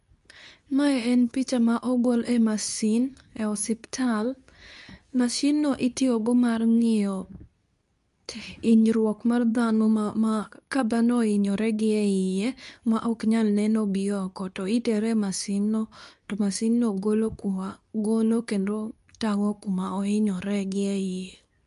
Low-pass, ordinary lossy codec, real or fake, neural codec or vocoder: 10.8 kHz; none; fake; codec, 24 kHz, 0.9 kbps, WavTokenizer, medium speech release version 2